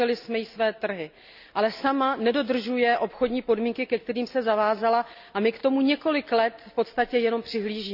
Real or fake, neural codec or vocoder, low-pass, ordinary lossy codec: real; none; 5.4 kHz; none